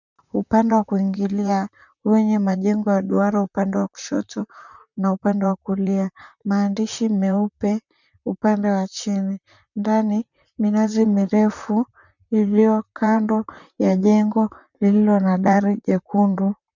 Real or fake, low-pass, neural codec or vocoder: fake; 7.2 kHz; vocoder, 44.1 kHz, 128 mel bands, Pupu-Vocoder